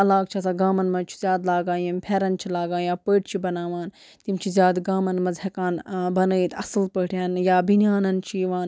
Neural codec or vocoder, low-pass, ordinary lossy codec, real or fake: none; none; none; real